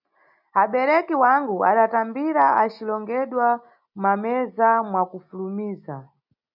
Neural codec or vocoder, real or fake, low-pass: none; real; 5.4 kHz